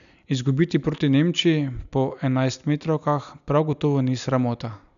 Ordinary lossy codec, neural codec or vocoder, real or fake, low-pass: none; none; real; 7.2 kHz